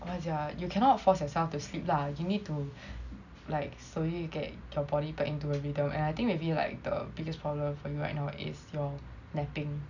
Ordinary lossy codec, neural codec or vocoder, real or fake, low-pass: none; none; real; 7.2 kHz